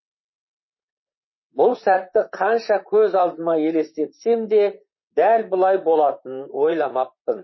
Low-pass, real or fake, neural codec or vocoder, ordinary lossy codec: 7.2 kHz; fake; vocoder, 44.1 kHz, 128 mel bands, Pupu-Vocoder; MP3, 24 kbps